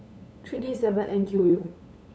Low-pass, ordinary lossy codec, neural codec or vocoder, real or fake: none; none; codec, 16 kHz, 8 kbps, FunCodec, trained on LibriTTS, 25 frames a second; fake